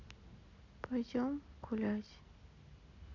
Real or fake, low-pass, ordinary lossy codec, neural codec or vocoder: real; 7.2 kHz; none; none